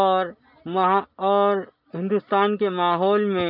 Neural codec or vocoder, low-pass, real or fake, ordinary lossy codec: none; 5.4 kHz; real; none